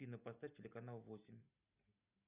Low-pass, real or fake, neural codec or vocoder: 3.6 kHz; real; none